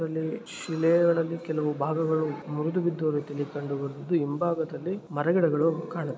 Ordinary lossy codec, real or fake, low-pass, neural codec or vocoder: none; real; none; none